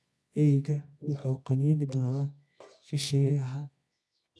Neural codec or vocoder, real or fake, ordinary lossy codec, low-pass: codec, 24 kHz, 0.9 kbps, WavTokenizer, medium music audio release; fake; none; none